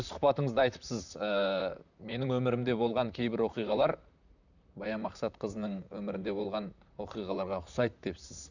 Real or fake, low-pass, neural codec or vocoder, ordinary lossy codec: fake; 7.2 kHz; vocoder, 44.1 kHz, 128 mel bands, Pupu-Vocoder; none